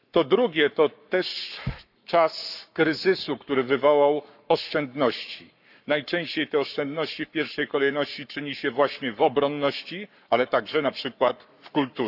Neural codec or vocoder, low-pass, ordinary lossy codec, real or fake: codec, 44.1 kHz, 7.8 kbps, Pupu-Codec; 5.4 kHz; none; fake